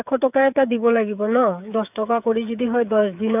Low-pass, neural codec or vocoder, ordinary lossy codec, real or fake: 3.6 kHz; codec, 16 kHz, 8 kbps, FreqCodec, smaller model; none; fake